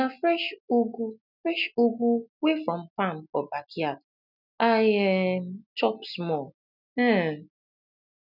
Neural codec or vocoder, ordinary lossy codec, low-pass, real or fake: none; none; 5.4 kHz; real